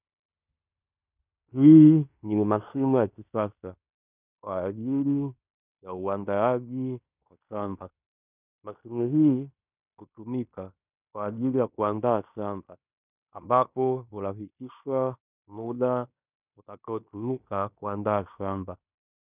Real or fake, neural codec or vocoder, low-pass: fake; codec, 16 kHz in and 24 kHz out, 0.9 kbps, LongCat-Audio-Codec, fine tuned four codebook decoder; 3.6 kHz